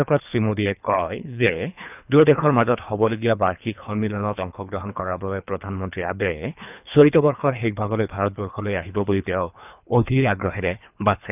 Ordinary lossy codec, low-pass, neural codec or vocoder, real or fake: none; 3.6 kHz; codec, 24 kHz, 3 kbps, HILCodec; fake